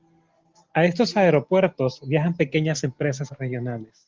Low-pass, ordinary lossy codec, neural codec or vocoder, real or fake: 7.2 kHz; Opus, 16 kbps; none; real